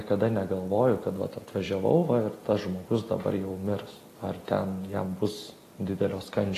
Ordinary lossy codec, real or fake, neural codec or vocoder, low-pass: AAC, 48 kbps; real; none; 14.4 kHz